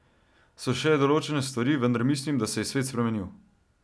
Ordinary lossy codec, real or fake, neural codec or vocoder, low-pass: none; real; none; none